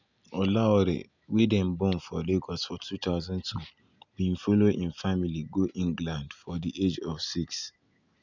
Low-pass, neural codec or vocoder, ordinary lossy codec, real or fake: 7.2 kHz; none; none; real